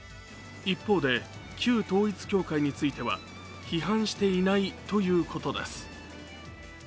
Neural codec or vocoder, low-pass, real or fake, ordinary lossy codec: none; none; real; none